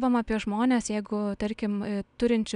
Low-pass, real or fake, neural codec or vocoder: 9.9 kHz; real; none